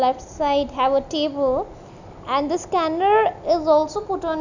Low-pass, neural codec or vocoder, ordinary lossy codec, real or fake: 7.2 kHz; none; none; real